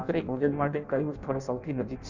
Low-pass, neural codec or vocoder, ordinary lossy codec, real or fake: 7.2 kHz; codec, 16 kHz in and 24 kHz out, 0.6 kbps, FireRedTTS-2 codec; none; fake